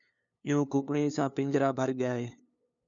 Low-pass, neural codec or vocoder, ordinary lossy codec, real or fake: 7.2 kHz; codec, 16 kHz, 2 kbps, FunCodec, trained on LibriTTS, 25 frames a second; MP3, 96 kbps; fake